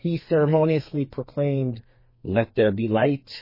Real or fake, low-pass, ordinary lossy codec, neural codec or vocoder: fake; 5.4 kHz; MP3, 24 kbps; codec, 32 kHz, 1.9 kbps, SNAC